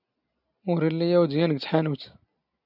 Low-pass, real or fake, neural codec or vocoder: 5.4 kHz; real; none